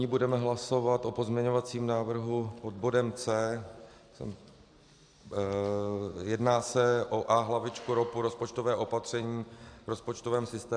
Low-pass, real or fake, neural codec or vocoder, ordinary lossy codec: 9.9 kHz; real; none; AAC, 64 kbps